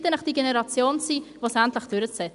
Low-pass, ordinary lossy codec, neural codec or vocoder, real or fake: 10.8 kHz; none; none; real